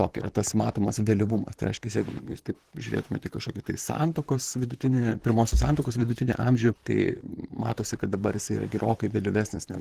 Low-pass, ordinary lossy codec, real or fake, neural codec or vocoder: 14.4 kHz; Opus, 16 kbps; fake; vocoder, 44.1 kHz, 128 mel bands, Pupu-Vocoder